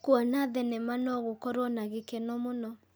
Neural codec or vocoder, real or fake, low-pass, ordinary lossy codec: none; real; none; none